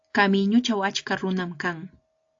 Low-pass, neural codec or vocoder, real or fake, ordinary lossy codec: 7.2 kHz; none; real; AAC, 48 kbps